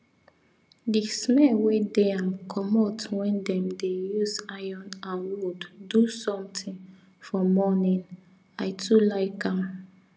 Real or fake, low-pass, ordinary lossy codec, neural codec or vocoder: real; none; none; none